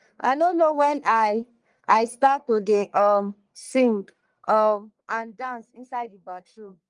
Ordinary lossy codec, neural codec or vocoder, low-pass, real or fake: Opus, 32 kbps; codec, 44.1 kHz, 1.7 kbps, Pupu-Codec; 10.8 kHz; fake